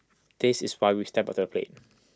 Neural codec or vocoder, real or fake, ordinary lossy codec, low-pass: none; real; none; none